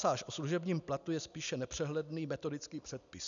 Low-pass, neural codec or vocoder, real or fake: 7.2 kHz; none; real